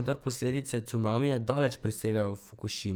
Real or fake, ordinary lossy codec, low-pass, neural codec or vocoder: fake; none; none; codec, 44.1 kHz, 2.6 kbps, SNAC